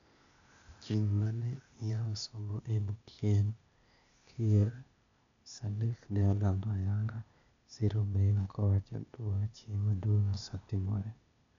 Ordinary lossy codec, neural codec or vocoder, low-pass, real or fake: none; codec, 16 kHz, 0.8 kbps, ZipCodec; 7.2 kHz; fake